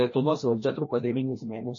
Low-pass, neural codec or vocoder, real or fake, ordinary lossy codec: 7.2 kHz; codec, 16 kHz, 1 kbps, FreqCodec, larger model; fake; MP3, 32 kbps